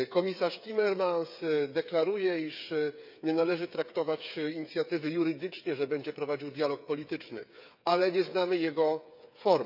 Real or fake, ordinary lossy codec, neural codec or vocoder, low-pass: fake; none; codec, 16 kHz, 8 kbps, FreqCodec, smaller model; 5.4 kHz